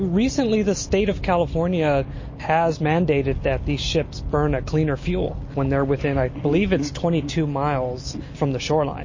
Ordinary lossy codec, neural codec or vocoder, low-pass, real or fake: MP3, 32 kbps; none; 7.2 kHz; real